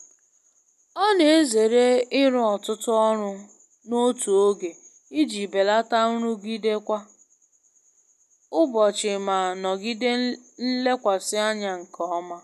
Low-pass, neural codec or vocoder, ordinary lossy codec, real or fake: 14.4 kHz; none; none; real